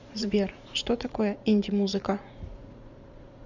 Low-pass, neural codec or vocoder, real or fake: 7.2 kHz; vocoder, 44.1 kHz, 80 mel bands, Vocos; fake